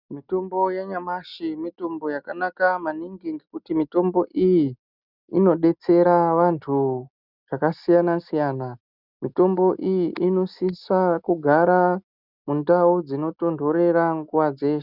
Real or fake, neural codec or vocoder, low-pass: real; none; 5.4 kHz